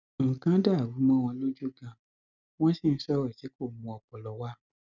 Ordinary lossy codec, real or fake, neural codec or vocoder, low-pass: none; real; none; 7.2 kHz